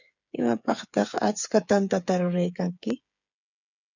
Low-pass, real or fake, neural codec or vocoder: 7.2 kHz; fake; codec, 16 kHz, 8 kbps, FreqCodec, smaller model